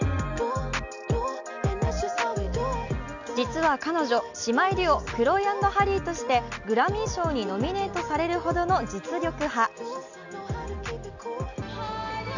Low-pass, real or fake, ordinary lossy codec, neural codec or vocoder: 7.2 kHz; real; none; none